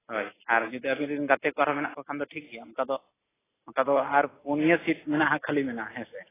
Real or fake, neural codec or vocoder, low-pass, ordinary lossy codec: real; none; 3.6 kHz; AAC, 16 kbps